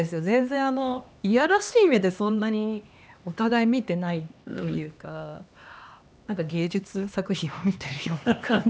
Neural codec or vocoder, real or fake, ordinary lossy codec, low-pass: codec, 16 kHz, 2 kbps, X-Codec, HuBERT features, trained on LibriSpeech; fake; none; none